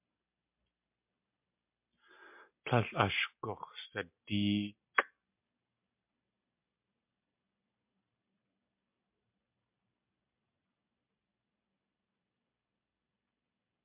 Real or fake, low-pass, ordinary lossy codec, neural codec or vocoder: real; 3.6 kHz; MP3, 32 kbps; none